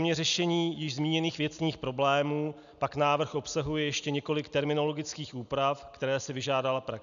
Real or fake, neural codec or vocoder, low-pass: real; none; 7.2 kHz